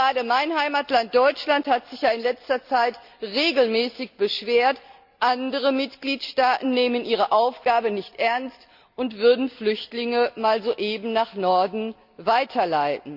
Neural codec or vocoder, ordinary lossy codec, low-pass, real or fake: none; Opus, 64 kbps; 5.4 kHz; real